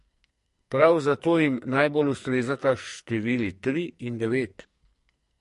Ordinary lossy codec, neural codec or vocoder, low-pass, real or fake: MP3, 48 kbps; codec, 44.1 kHz, 2.6 kbps, SNAC; 14.4 kHz; fake